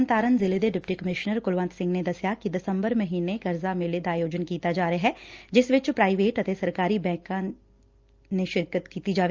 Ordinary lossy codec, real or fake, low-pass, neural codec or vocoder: Opus, 24 kbps; real; 7.2 kHz; none